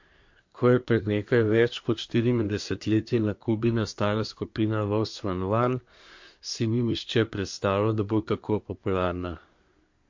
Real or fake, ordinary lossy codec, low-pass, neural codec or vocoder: fake; MP3, 48 kbps; 7.2 kHz; codec, 24 kHz, 1 kbps, SNAC